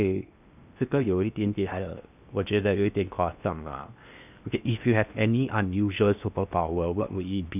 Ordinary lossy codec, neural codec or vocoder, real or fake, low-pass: none; codec, 16 kHz in and 24 kHz out, 0.8 kbps, FocalCodec, streaming, 65536 codes; fake; 3.6 kHz